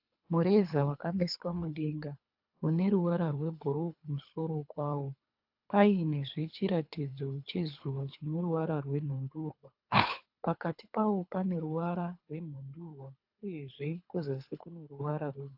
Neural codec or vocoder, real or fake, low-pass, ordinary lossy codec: codec, 24 kHz, 3 kbps, HILCodec; fake; 5.4 kHz; AAC, 48 kbps